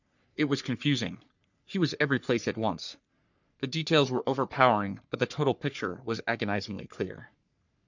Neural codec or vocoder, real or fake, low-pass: codec, 44.1 kHz, 3.4 kbps, Pupu-Codec; fake; 7.2 kHz